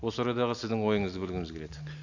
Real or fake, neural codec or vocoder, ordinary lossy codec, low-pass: real; none; none; 7.2 kHz